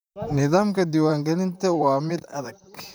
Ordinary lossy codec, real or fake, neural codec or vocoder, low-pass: none; fake; vocoder, 44.1 kHz, 128 mel bands, Pupu-Vocoder; none